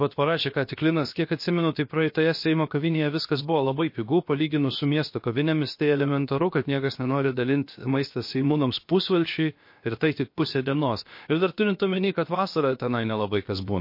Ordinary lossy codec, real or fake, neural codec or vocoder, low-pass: MP3, 32 kbps; fake; codec, 16 kHz, about 1 kbps, DyCAST, with the encoder's durations; 5.4 kHz